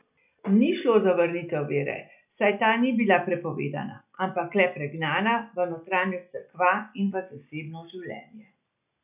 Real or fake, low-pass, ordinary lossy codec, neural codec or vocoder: real; 3.6 kHz; none; none